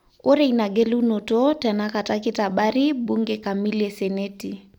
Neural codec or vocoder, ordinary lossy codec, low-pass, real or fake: none; none; 19.8 kHz; real